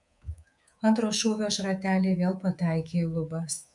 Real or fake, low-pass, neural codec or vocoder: fake; 10.8 kHz; codec, 24 kHz, 3.1 kbps, DualCodec